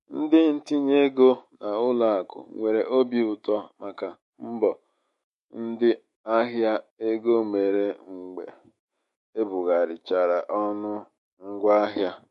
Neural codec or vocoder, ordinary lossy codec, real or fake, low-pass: autoencoder, 48 kHz, 128 numbers a frame, DAC-VAE, trained on Japanese speech; MP3, 48 kbps; fake; 14.4 kHz